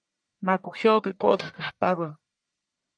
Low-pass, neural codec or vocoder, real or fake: 9.9 kHz; codec, 44.1 kHz, 1.7 kbps, Pupu-Codec; fake